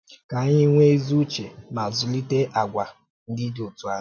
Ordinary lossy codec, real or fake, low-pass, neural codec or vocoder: none; real; none; none